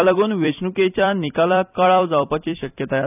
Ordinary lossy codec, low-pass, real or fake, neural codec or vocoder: none; 3.6 kHz; fake; vocoder, 44.1 kHz, 128 mel bands every 256 samples, BigVGAN v2